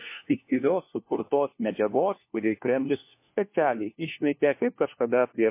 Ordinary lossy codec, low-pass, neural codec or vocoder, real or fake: MP3, 24 kbps; 3.6 kHz; codec, 16 kHz, 1 kbps, FunCodec, trained on LibriTTS, 50 frames a second; fake